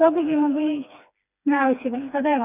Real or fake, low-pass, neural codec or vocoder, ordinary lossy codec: fake; 3.6 kHz; codec, 16 kHz, 2 kbps, FreqCodec, smaller model; none